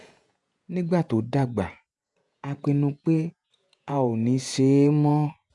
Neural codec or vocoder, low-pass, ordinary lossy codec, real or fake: vocoder, 48 kHz, 128 mel bands, Vocos; 10.8 kHz; none; fake